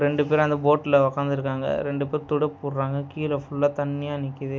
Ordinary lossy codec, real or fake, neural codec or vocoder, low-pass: none; real; none; none